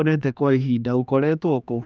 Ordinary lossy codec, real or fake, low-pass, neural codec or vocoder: none; fake; none; codec, 16 kHz, 2 kbps, X-Codec, HuBERT features, trained on general audio